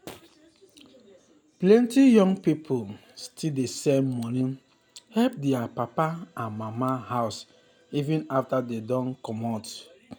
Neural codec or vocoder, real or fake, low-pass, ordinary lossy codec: none; real; none; none